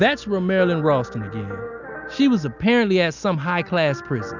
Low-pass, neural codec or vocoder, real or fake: 7.2 kHz; none; real